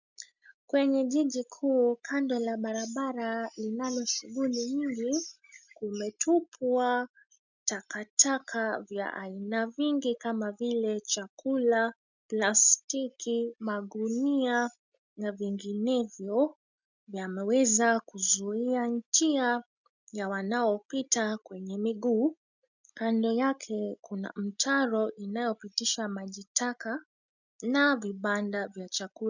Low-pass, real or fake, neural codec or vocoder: 7.2 kHz; real; none